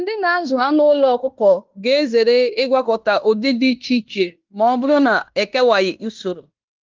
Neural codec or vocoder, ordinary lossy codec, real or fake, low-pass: codec, 16 kHz in and 24 kHz out, 0.9 kbps, LongCat-Audio-Codec, fine tuned four codebook decoder; Opus, 24 kbps; fake; 7.2 kHz